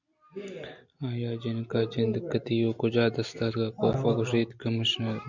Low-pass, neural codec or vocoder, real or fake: 7.2 kHz; none; real